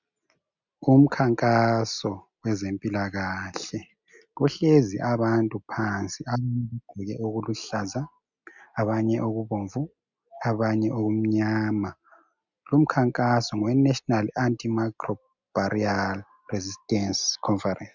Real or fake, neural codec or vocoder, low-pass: real; none; 7.2 kHz